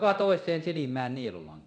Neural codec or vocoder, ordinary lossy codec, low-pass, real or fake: codec, 24 kHz, 0.9 kbps, DualCodec; none; 9.9 kHz; fake